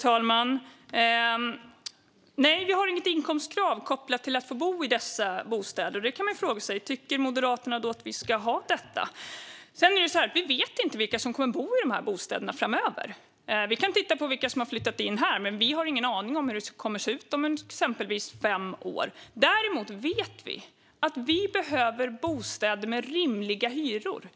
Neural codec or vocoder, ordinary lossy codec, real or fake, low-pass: none; none; real; none